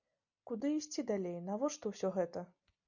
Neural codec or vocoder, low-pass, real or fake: none; 7.2 kHz; real